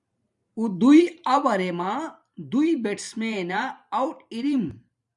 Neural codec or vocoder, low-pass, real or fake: vocoder, 44.1 kHz, 128 mel bands every 512 samples, BigVGAN v2; 10.8 kHz; fake